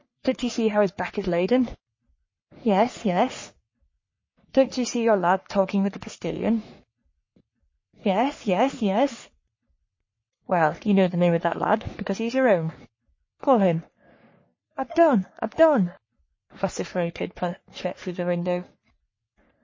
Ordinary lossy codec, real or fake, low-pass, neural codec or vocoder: MP3, 32 kbps; fake; 7.2 kHz; codec, 44.1 kHz, 3.4 kbps, Pupu-Codec